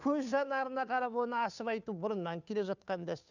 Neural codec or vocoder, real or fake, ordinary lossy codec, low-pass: codec, 16 kHz, 2 kbps, FunCodec, trained on Chinese and English, 25 frames a second; fake; none; 7.2 kHz